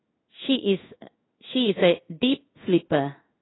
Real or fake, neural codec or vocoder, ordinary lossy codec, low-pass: fake; codec, 16 kHz in and 24 kHz out, 1 kbps, XY-Tokenizer; AAC, 16 kbps; 7.2 kHz